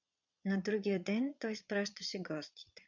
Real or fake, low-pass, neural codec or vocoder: fake; 7.2 kHz; vocoder, 22.05 kHz, 80 mel bands, Vocos